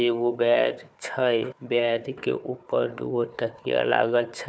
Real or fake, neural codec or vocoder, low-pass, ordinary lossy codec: fake; codec, 16 kHz, 4 kbps, FunCodec, trained on Chinese and English, 50 frames a second; none; none